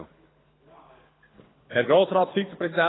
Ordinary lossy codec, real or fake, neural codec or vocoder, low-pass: AAC, 16 kbps; fake; codec, 24 kHz, 6 kbps, HILCodec; 7.2 kHz